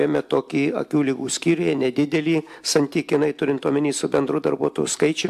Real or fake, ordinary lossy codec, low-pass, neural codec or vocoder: real; MP3, 96 kbps; 14.4 kHz; none